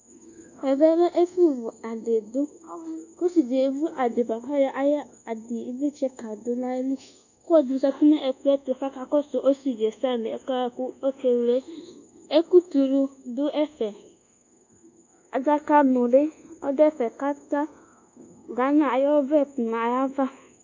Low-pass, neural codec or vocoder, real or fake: 7.2 kHz; codec, 24 kHz, 1.2 kbps, DualCodec; fake